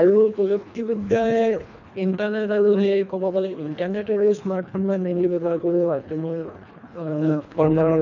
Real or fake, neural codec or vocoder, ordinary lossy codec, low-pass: fake; codec, 24 kHz, 1.5 kbps, HILCodec; none; 7.2 kHz